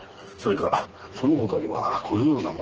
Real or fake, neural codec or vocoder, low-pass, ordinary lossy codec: fake; codec, 16 kHz, 2 kbps, FreqCodec, smaller model; 7.2 kHz; Opus, 16 kbps